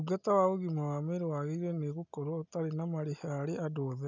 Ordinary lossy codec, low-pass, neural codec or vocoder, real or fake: none; 7.2 kHz; none; real